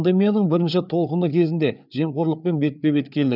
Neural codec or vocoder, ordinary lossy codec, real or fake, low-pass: codec, 16 kHz, 4 kbps, FreqCodec, larger model; none; fake; 5.4 kHz